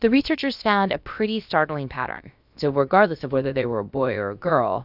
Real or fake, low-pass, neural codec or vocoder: fake; 5.4 kHz; codec, 16 kHz, about 1 kbps, DyCAST, with the encoder's durations